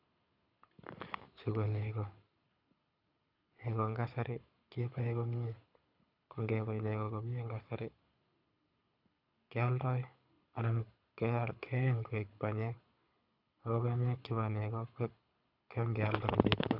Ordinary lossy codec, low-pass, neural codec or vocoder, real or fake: none; 5.4 kHz; codec, 24 kHz, 6 kbps, HILCodec; fake